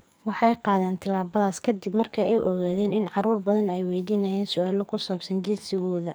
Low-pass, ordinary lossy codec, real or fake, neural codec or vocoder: none; none; fake; codec, 44.1 kHz, 2.6 kbps, SNAC